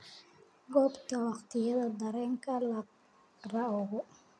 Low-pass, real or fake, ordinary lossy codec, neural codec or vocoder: none; real; none; none